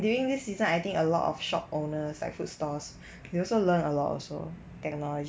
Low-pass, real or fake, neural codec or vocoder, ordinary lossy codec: none; real; none; none